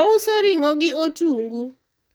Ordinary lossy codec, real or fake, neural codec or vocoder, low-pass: none; fake; codec, 44.1 kHz, 2.6 kbps, SNAC; none